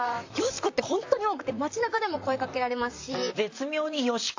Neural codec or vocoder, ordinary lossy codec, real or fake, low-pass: vocoder, 44.1 kHz, 128 mel bands, Pupu-Vocoder; AAC, 48 kbps; fake; 7.2 kHz